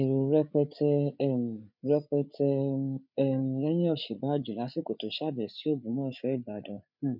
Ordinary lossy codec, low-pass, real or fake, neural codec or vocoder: none; 5.4 kHz; fake; codec, 16 kHz, 8 kbps, FreqCodec, larger model